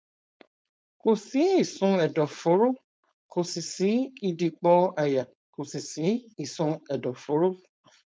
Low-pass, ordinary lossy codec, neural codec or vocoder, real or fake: none; none; codec, 16 kHz, 4.8 kbps, FACodec; fake